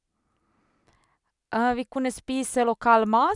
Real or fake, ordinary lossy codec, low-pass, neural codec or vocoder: real; none; 10.8 kHz; none